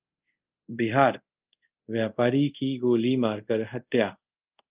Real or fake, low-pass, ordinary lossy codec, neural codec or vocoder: fake; 3.6 kHz; Opus, 24 kbps; codec, 24 kHz, 0.5 kbps, DualCodec